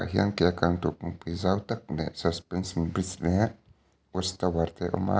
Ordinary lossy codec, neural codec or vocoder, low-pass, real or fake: none; none; none; real